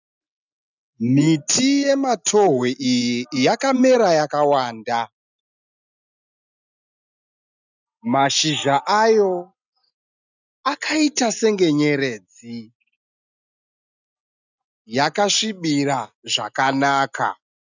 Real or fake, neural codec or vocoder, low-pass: real; none; 7.2 kHz